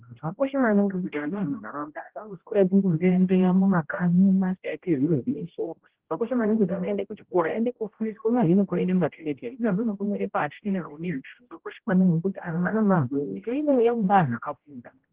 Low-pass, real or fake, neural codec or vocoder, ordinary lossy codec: 3.6 kHz; fake; codec, 16 kHz, 0.5 kbps, X-Codec, HuBERT features, trained on general audio; Opus, 16 kbps